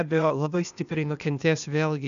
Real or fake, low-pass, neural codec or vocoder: fake; 7.2 kHz; codec, 16 kHz, 0.8 kbps, ZipCodec